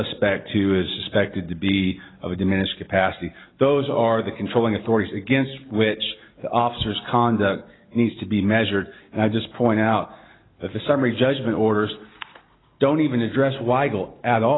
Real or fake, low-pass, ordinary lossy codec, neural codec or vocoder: real; 7.2 kHz; AAC, 16 kbps; none